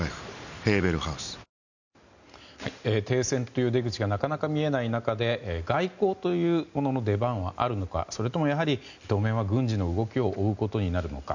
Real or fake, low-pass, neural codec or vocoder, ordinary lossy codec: real; 7.2 kHz; none; none